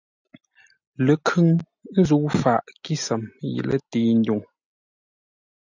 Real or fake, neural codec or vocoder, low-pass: real; none; 7.2 kHz